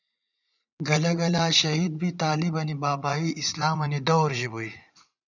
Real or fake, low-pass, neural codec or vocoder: fake; 7.2 kHz; vocoder, 44.1 kHz, 80 mel bands, Vocos